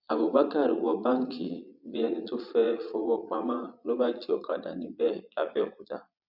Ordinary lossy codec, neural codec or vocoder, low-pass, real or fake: none; vocoder, 22.05 kHz, 80 mel bands, Vocos; 5.4 kHz; fake